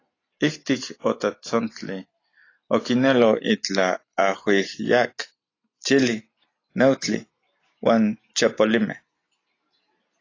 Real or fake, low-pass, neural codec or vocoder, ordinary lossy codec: real; 7.2 kHz; none; AAC, 32 kbps